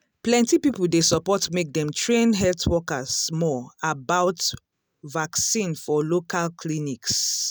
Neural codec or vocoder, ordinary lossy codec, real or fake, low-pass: none; none; real; none